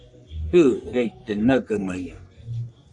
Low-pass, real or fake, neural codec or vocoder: 10.8 kHz; fake; codec, 44.1 kHz, 3.4 kbps, Pupu-Codec